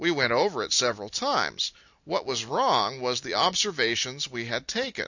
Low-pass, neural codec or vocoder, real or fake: 7.2 kHz; none; real